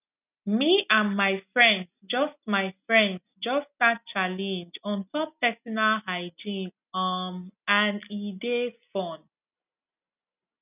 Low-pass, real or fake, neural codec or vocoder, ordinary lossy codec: 3.6 kHz; real; none; none